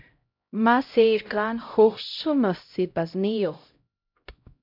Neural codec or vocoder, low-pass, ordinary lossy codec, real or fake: codec, 16 kHz, 0.5 kbps, X-Codec, HuBERT features, trained on LibriSpeech; 5.4 kHz; MP3, 48 kbps; fake